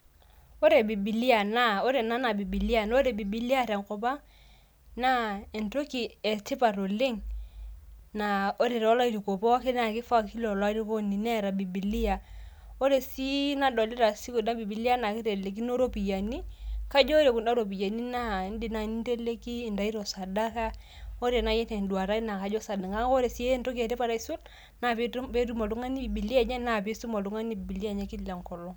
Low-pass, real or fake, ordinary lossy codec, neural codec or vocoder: none; real; none; none